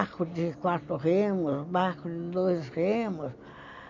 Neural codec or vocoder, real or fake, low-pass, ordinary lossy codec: none; real; 7.2 kHz; none